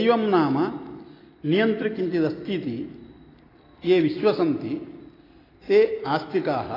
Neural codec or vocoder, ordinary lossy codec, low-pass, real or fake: none; AAC, 24 kbps; 5.4 kHz; real